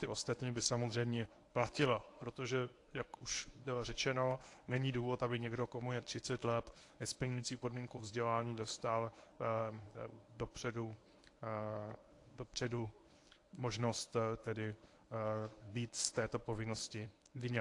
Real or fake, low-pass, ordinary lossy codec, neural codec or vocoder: fake; 10.8 kHz; AAC, 48 kbps; codec, 24 kHz, 0.9 kbps, WavTokenizer, medium speech release version 1